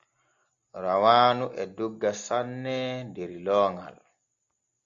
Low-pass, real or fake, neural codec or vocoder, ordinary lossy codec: 7.2 kHz; real; none; Opus, 64 kbps